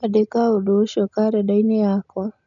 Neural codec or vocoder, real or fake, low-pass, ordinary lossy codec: none; real; 7.2 kHz; none